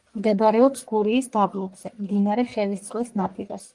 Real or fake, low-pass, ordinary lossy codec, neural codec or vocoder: fake; 10.8 kHz; Opus, 24 kbps; codec, 44.1 kHz, 1.7 kbps, Pupu-Codec